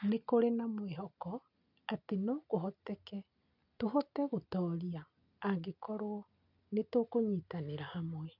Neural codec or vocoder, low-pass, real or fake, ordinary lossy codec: none; 5.4 kHz; real; none